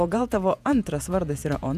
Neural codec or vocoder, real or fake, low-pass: vocoder, 48 kHz, 128 mel bands, Vocos; fake; 14.4 kHz